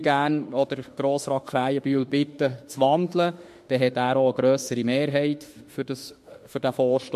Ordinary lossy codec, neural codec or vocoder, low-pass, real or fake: MP3, 64 kbps; autoencoder, 48 kHz, 32 numbers a frame, DAC-VAE, trained on Japanese speech; 14.4 kHz; fake